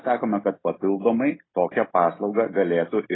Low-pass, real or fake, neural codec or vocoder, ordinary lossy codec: 7.2 kHz; real; none; AAC, 16 kbps